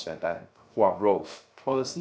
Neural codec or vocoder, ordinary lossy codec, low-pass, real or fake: codec, 16 kHz, 0.3 kbps, FocalCodec; none; none; fake